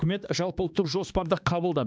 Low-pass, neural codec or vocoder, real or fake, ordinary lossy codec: none; codec, 16 kHz, 2 kbps, X-Codec, HuBERT features, trained on balanced general audio; fake; none